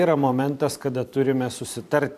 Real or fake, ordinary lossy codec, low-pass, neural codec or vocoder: fake; AAC, 96 kbps; 14.4 kHz; vocoder, 44.1 kHz, 128 mel bands every 512 samples, BigVGAN v2